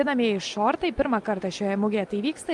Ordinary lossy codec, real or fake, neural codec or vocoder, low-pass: Opus, 32 kbps; real; none; 10.8 kHz